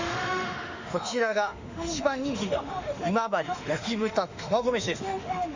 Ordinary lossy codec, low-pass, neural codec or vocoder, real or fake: Opus, 64 kbps; 7.2 kHz; autoencoder, 48 kHz, 32 numbers a frame, DAC-VAE, trained on Japanese speech; fake